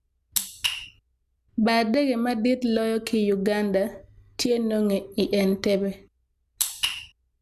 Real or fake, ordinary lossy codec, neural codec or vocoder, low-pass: real; none; none; 14.4 kHz